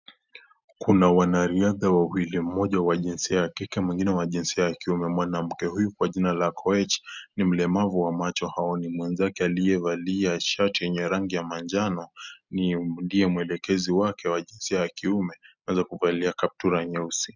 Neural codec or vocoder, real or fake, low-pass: none; real; 7.2 kHz